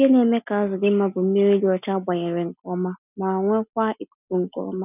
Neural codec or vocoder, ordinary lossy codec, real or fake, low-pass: none; none; real; 3.6 kHz